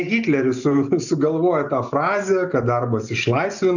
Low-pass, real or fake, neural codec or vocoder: 7.2 kHz; real; none